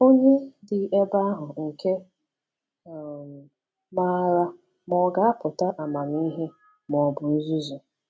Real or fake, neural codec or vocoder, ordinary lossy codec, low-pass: real; none; none; none